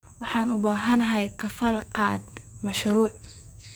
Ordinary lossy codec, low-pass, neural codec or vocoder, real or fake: none; none; codec, 44.1 kHz, 2.6 kbps, SNAC; fake